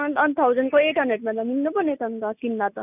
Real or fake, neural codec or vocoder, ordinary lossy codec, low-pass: real; none; none; 3.6 kHz